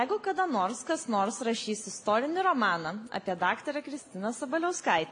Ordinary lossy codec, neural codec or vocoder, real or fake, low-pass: AAC, 48 kbps; none; real; 9.9 kHz